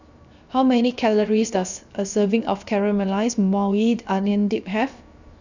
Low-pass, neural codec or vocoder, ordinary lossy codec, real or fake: 7.2 kHz; codec, 16 kHz, 0.7 kbps, FocalCodec; none; fake